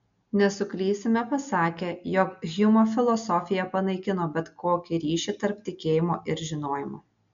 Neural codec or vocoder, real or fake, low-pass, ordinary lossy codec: none; real; 7.2 kHz; MP3, 64 kbps